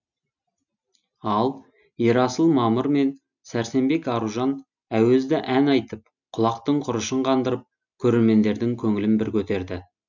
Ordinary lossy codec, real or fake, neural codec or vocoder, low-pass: none; real; none; 7.2 kHz